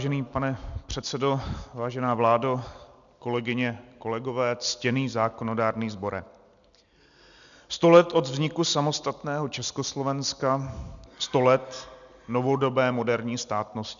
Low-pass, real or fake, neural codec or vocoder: 7.2 kHz; real; none